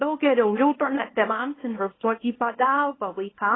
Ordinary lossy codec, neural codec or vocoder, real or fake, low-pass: AAC, 16 kbps; codec, 24 kHz, 0.9 kbps, WavTokenizer, small release; fake; 7.2 kHz